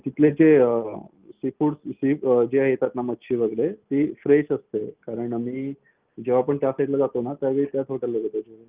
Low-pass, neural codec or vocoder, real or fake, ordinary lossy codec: 3.6 kHz; none; real; Opus, 32 kbps